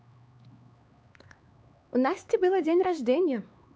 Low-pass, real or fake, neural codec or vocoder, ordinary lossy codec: none; fake; codec, 16 kHz, 4 kbps, X-Codec, HuBERT features, trained on LibriSpeech; none